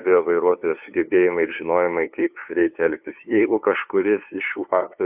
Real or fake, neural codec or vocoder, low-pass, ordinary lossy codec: fake; codec, 16 kHz, 2 kbps, FunCodec, trained on LibriTTS, 25 frames a second; 3.6 kHz; AAC, 32 kbps